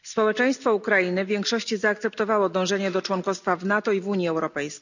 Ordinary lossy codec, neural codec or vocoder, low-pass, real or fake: none; none; 7.2 kHz; real